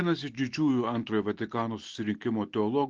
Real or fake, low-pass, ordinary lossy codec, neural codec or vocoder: real; 7.2 kHz; Opus, 16 kbps; none